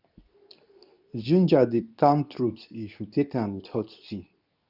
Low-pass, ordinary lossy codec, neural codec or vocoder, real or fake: 5.4 kHz; none; codec, 24 kHz, 0.9 kbps, WavTokenizer, medium speech release version 2; fake